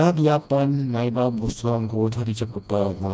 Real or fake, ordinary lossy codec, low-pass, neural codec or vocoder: fake; none; none; codec, 16 kHz, 1 kbps, FreqCodec, smaller model